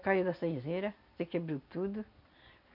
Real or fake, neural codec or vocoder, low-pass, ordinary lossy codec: real; none; 5.4 kHz; none